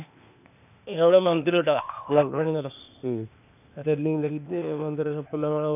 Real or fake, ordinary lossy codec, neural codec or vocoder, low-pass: fake; none; codec, 16 kHz, 0.8 kbps, ZipCodec; 3.6 kHz